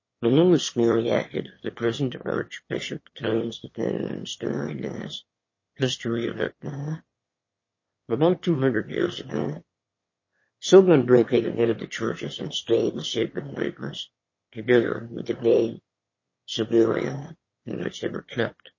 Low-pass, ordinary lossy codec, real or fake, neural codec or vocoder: 7.2 kHz; MP3, 32 kbps; fake; autoencoder, 22.05 kHz, a latent of 192 numbers a frame, VITS, trained on one speaker